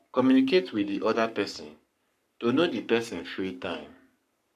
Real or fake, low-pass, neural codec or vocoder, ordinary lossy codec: fake; 14.4 kHz; codec, 44.1 kHz, 7.8 kbps, Pupu-Codec; none